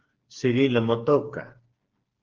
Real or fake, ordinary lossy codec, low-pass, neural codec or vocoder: fake; Opus, 16 kbps; 7.2 kHz; codec, 16 kHz, 4 kbps, FreqCodec, smaller model